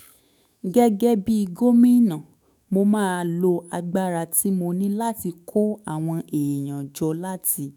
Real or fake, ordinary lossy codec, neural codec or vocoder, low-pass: fake; none; autoencoder, 48 kHz, 128 numbers a frame, DAC-VAE, trained on Japanese speech; none